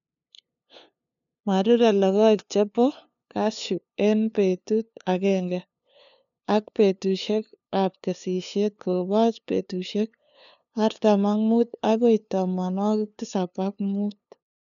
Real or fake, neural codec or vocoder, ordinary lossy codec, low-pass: fake; codec, 16 kHz, 2 kbps, FunCodec, trained on LibriTTS, 25 frames a second; none; 7.2 kHz